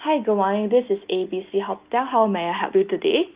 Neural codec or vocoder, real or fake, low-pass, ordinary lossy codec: none; real; 3.6 kHz; Opus, 32 kbps